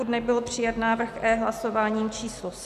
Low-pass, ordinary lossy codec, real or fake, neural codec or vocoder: 14.4 kHz; MP3, 96 kbps; real; none